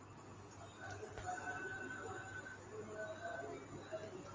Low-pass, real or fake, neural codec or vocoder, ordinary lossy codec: 7.2 kHz; real; none; Opus, 32 kbps